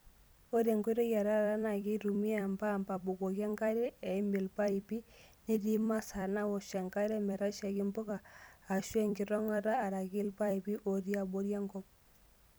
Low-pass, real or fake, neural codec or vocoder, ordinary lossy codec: none; fake; vocoder, 44.1 kHz, 128 mel bands every 256 samples, BigVGAN v2; none